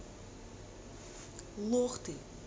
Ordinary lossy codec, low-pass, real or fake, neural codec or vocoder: none; none; real; none